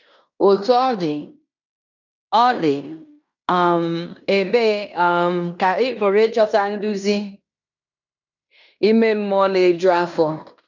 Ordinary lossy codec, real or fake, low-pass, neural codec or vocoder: none; fake; 7.2 kHz; codec, 16 kHz in and 24 kHz out, 0.9 kbps, LongCat-Audio-Codec, fine tuned four codebook decoder